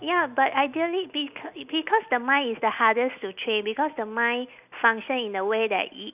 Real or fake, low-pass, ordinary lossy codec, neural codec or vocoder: real; 3.6 kHz; none; none